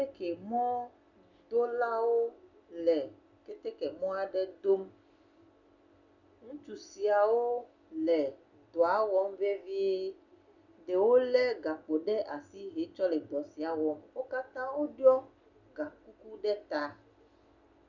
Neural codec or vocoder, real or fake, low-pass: none; real; 7.2 kHz